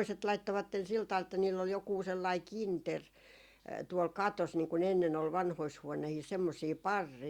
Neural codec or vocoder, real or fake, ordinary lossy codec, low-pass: none; real; Opus, 64 kbps; 19.8 kHz